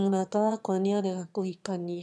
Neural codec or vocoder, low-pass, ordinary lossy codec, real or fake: autoencoder, 22.05 kHz, a latent of 192 numbers a frame, VITS, trained on one speaker; none; none; fake